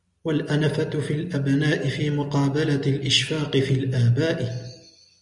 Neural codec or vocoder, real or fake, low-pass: none; real; 10.8 kHz